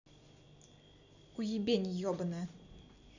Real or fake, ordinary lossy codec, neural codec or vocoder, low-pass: real; none; none; 7.2 kHz